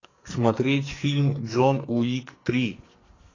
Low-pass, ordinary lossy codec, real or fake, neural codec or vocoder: 7.2 kHz; AAC, 32 kbps; fake; codec, 44.1 kHz, 2.6 kbps, SNAC